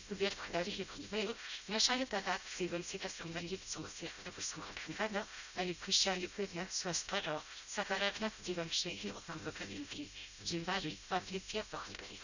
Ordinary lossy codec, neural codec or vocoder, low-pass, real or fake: none; codec, 16 kHz, 0.5 kbps, FreqCodec, smaller model; 7.2 kHz; fake